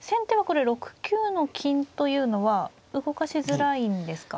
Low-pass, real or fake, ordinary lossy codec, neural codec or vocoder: none; real; none; none